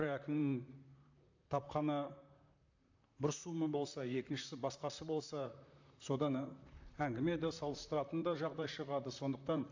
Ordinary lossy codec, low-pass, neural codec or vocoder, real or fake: AAC, 48 kbps; 7.2 kHz; vocoder, 44.1 kHz, 128 mel bands, Pupu-Vocoder; fake